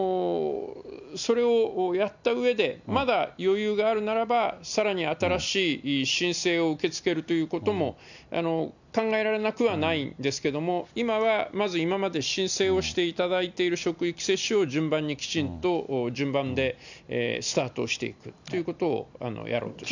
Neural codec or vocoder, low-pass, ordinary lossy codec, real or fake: none; 7.2 kHz; none; real